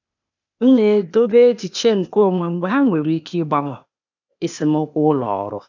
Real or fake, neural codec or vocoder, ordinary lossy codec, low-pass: fake; codec, 16 kHz, 0.8 kbps, ZipCodec; none; 7.2 kHz